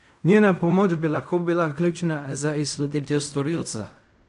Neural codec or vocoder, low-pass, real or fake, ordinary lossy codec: codec, 16 kHz in and 24 kHz out, 0.4 kbps, LongCat-Audio-Codec, fine tuned four codebook decoder; 10.8 kHz; fake; AAC, 96 kbps